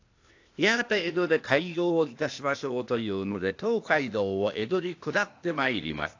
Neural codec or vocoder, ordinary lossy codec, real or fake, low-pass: codec, 16 kHz, 0.8 kbps, ZipCodec; AAC, 48 kbps; fake; 7.2 kHz